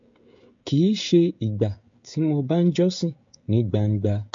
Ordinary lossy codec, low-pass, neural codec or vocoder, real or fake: MP3, 48 kbps; 7.2 kHz; codec, 16 kHz, 16 kbps, FreqCodec, smaller model; fake